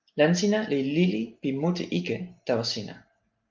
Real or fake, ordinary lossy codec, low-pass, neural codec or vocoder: real; Opus, 32 kbps; 7.2 kHz; none